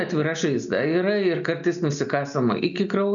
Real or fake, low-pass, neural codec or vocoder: real; 7.2 kHz; none